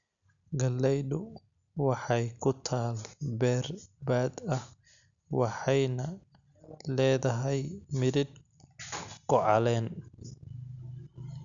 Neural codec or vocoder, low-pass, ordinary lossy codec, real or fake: none; 7.2 kHz; none; real